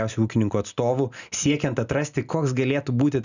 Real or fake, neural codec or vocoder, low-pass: real; none; 7.2 kHz